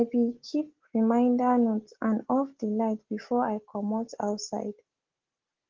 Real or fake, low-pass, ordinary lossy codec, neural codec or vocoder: real; 7.2 kHz; Opus, 16 kbps; none